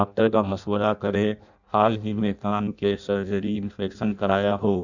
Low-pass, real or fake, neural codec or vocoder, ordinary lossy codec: 7.2 kHz; fake; codec, 16 kHz in and 24 kHz out, 0.6 kbps, FireRedTTS-2 codec; none